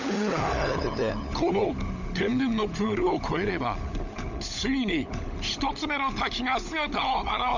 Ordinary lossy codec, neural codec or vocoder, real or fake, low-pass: none; codec, 16 kHz, 16 kbps, FunCodec, trained on LibriTTS, 50 frames a second; fake; 7.2 kHz